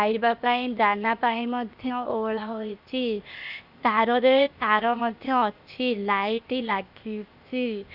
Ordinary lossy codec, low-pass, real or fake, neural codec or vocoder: Opus, 64 kbps; 5.4 kHz; fake; codec, 16 kHz, 0.8 kbps, ZipCodec